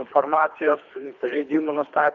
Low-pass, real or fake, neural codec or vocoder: 7.2 kHz; fake; codec, 24 kHz, 3 kbps, HILCodec